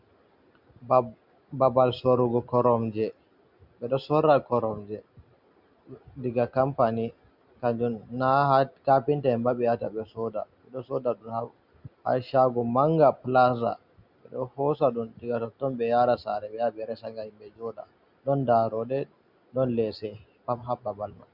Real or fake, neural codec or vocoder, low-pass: real; none; 5.4 kHz